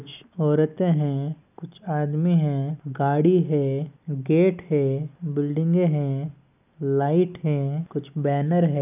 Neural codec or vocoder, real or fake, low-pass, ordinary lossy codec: none; real; 3.6 kHz; none